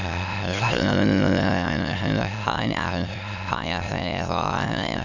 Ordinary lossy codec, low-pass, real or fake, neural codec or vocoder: none; 7.2 kHz; fake; autoencoder, 22.05 kHz, a latent of 192 numbers a frame, VITS, trained on many speakers